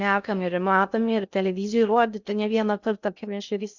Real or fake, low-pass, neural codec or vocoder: fake; 7.2 kHz; codec, 16 kHz in and 24 kHz out, 0.6 kbps, FocalCodec, streaming, 2048 codes